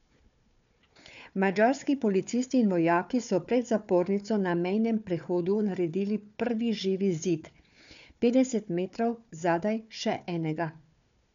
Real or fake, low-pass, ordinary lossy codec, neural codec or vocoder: fake; 7.2 kHz; MP3, 96 kbps; codec, 16 kHz, 4 kbps, FunCodec, trained on Chinese and English, 50 frames a second